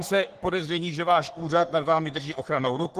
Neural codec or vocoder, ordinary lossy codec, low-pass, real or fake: codec, 44.1 kHz, 3.4 kbps, Pupu-Codec; Opus, 16 kbps; 14.4 kHz; fake